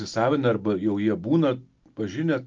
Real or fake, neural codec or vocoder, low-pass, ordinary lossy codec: real; none; 7.2 kHz; Opus, 24 kbps